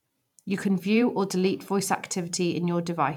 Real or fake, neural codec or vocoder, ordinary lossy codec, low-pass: fake; vocoder, 44.1 kHz, 128 mel bands every 512 samples, BigVGAN v2; none; 19.8 kHz